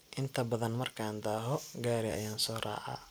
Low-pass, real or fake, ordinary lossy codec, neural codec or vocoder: none; real; none; none